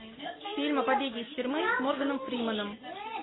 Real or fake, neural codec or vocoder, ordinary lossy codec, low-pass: real; none; AAC, 16 kbps; 7.2 kHz